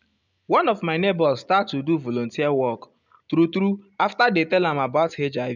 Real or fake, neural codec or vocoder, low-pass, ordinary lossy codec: real; none; 7.2 kHz; none